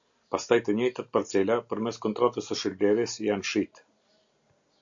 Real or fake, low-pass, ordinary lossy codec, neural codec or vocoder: real; 7.2 kHz; AAC, 64 kbps; none